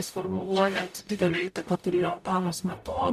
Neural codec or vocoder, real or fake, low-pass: codec, 44.1 kHz, 0.9 kbps, DAC; fake; 14.4 kHz